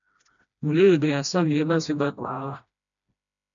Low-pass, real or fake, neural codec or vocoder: 7.2 kHz; fake; codec, 16 kHz, 1 kbps, FreqCodec, smaller model